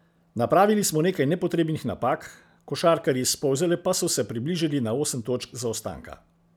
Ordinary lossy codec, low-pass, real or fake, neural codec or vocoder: none; none; real; none